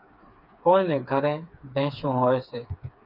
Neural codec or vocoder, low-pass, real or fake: codec, 16 kHz, 4 kbps, FreqCodec, smaller model; 5.4 kHz; fake